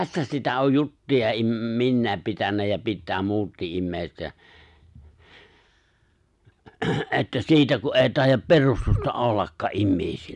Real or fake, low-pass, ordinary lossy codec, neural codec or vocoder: real; 10.8 kHz; none; none